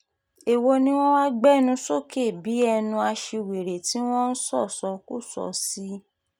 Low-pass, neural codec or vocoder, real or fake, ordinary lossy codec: none; none; real; none